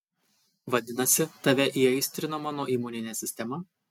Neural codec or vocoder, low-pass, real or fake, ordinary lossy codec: none; 19.8 kHz; real; MP3, 96 kbps